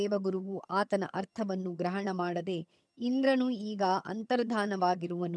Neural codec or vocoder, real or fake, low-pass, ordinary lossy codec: vocoder, 22.05 kHz, 80 mel bands, HiFi-GAN; fake; none; none